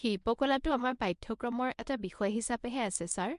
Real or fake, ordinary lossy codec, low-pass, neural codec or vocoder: fake; MP3, 64 kbps; 10.8 kHz; codec, 24 kHz, 0.9 kbps, WavTokenizer, medium speech release version 1